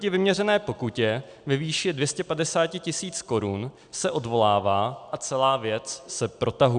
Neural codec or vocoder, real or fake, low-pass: none; real; 9.9 kHz